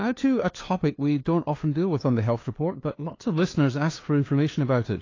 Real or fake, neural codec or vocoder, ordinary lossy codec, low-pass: fake; codec, 16 kHz, 2 kbps, FunCodec, trained on LibriTTS, 25 frames a second; AAC, 32 kbps; 7.2 kHz